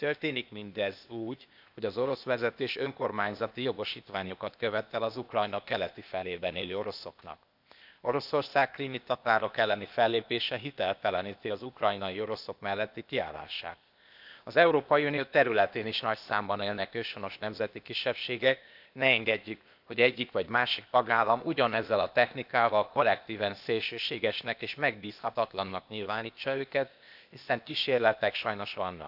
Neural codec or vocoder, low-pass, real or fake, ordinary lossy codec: codec, 16 kHz, 0.8 kbps, ZipCodec; 5.4 kHz; fake; none